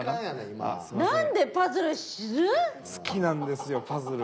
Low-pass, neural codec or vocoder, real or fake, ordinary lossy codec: none; none; real; none